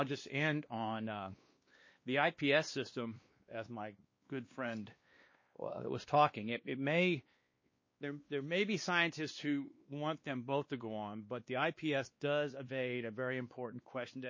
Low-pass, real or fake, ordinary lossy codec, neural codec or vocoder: 7.2 kHz; fake; MP3, 32 kbps; codec, 16 kHz, 2 kbps, X-Codec, WavLM features, trained on Multilingual LibriSpeech